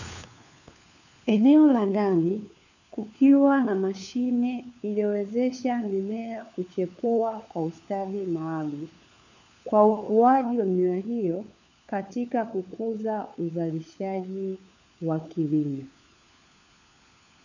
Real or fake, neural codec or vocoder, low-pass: fake; codec, 16 kHz, 4 kbps, FunCodec, trained on LibriTTS, 50 frames a second; 7.2 kHz